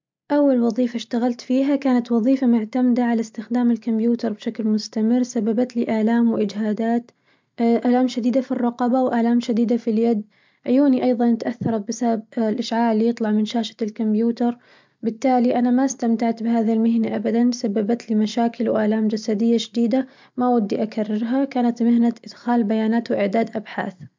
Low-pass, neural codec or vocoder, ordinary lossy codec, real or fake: 7.2 kHz; none; MP3, 64 kbps; real